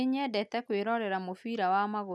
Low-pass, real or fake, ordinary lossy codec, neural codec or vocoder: none; real; none; none